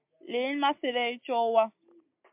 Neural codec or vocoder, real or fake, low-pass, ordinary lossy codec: none; real; 3.6 kHz; MP3, 32 kbps